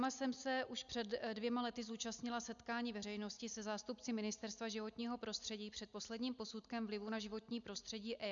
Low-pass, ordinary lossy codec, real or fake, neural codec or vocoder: 7.2 kHz; MP3, 96 kbps; real; none